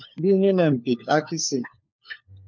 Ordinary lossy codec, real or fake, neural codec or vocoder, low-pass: MP3, 64 kbps; fake; codec, 16 kHz, 4 kbps, FunCodec, trained on LibriTTS, 50 frames a second; 7.2 kHz